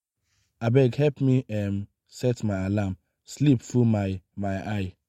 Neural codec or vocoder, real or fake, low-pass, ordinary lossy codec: none; real; 19.8 kHz; MP3, 64 kbps